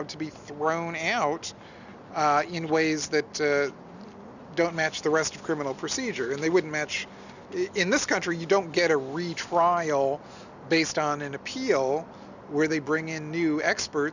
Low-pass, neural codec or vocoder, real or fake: 7.2 kHz; none; real